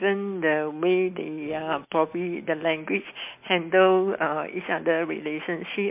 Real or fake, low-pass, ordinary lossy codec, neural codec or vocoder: real; 3.6 kHz; MP3, 32 kbps; none